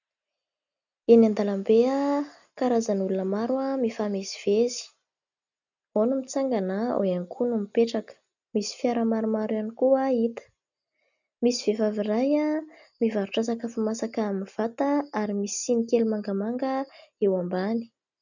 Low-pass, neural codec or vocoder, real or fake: 7.2 kHz; none; real